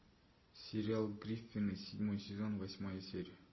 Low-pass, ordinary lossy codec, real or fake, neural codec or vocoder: 7.2 kHz; MP3, 24 kbps; real; none